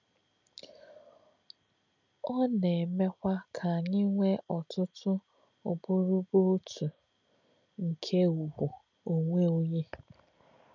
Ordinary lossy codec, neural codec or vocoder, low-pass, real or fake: none; none; 7.2 kHz; real